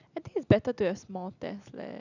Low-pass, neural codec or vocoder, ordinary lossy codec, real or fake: 7.2 kHz; none; none; real